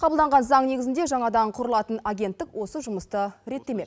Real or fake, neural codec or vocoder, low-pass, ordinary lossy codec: real; none; none; none